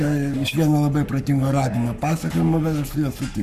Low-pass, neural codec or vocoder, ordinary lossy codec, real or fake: 14.4 kHz; codec, 44.1 kHz, 7.8 kbps, Pupu-Codec; Opus, 64 kbps; fake